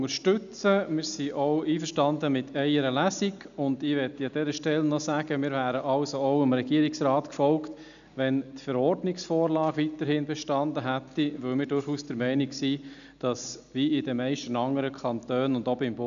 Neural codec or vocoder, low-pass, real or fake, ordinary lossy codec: none; 7.2 kHz; real; none